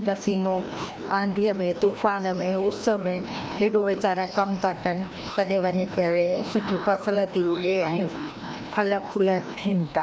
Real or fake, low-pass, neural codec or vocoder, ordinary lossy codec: fake; none; codec, 16 kHz, 1 kbps, FreqCodec, larger model; none